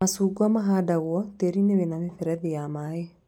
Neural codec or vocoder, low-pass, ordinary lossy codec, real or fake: none; 19.8 kHz; none; real